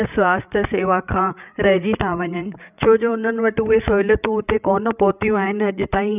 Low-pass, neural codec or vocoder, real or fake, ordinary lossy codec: 3.6 kHz; codec, 16 kHz, 8 kbps, FreqCodec, larger model; fake; none